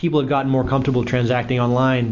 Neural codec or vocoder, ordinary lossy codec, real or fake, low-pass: none; Opus, 64 kbps; real; 7.2 kHz